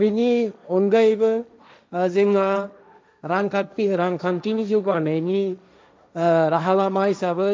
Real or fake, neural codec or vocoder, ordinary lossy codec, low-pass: fake; codec, 16 kHz, 1.1 kbps, Voila-Tokenizer; none; none